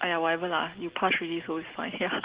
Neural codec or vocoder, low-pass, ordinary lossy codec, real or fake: none; 3.6 kHz; Opus, 16 kbps; real